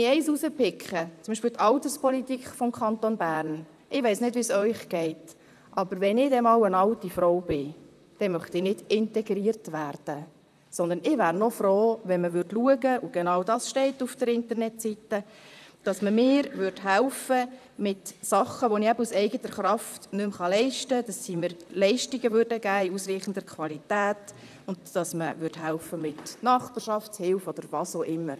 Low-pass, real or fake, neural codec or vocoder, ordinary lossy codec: 14.4 kHz; fake; vocoder, 44.1 kHz, 128 mel bands, Pupu-Vocoder; none